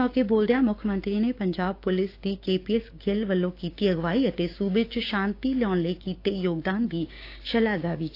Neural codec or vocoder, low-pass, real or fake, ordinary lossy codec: codec, 16 kHz, 6 kbps, DAC; 5.4 kHz; fake; AAC, 32 kbps